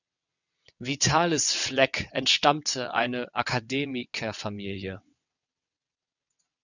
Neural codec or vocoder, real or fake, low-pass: vocoder, 22.05 kHz, 80 mel bands, WaveNeXt; fake; 7.2 kHz